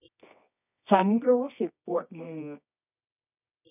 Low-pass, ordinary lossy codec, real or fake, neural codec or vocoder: 3.6 kHz; none; fake; codec, 24 kHz, 0.9 kbps, WavTokenizer, medium music audio release